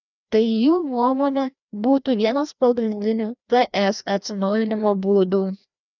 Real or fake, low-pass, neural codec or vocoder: fake; 7.2 kHz; codec, 16 kHz, 1 kbps, FreqCodec, larger model